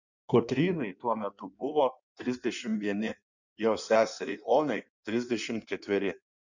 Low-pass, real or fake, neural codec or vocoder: 7.2 kHz; fake; codec, 16 kHz in and 24 kHz out, 1.1 kbps, FireRedTTS-2 codec